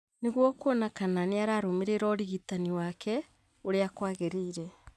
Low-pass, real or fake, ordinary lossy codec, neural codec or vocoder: none; real; none; none